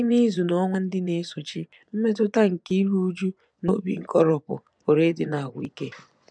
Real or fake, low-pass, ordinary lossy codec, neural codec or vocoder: fake; 9.9 kHz; none; vocoder, 24 kHz, 100 mel bands, Vocos